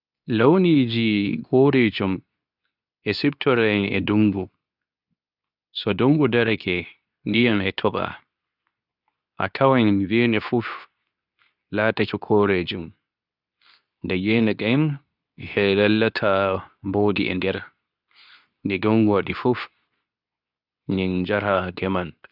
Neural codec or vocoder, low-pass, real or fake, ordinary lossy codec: codec, 24 kHz, 0.9 kbps, WavTokenizer, medium speech release version 2; 5.4 kHz; fake; none